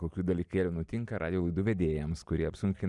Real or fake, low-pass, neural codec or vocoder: fake; 10.8 kHz; vocoder, 44.1 kHz, 128 mel bands every 256 samples, BigVGAN v2